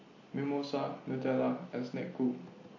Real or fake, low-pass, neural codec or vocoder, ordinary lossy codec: real; 7.2 kHz; none; MP3, 32 kbps